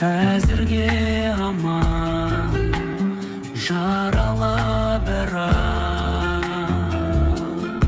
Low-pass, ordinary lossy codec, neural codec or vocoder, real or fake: none; none; codec, 16 kHz, 8 kbps, FreqCodec, smaller model; fake